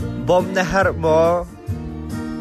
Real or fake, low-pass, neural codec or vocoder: real; 14.4 kHz; none